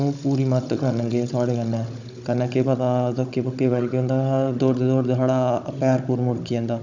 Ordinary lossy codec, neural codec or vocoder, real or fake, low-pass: none; codec, 16 kHz, 16 kbps, FunCodec, trained on Chinese and English, 50 frames a second; fake; 7.2 kHz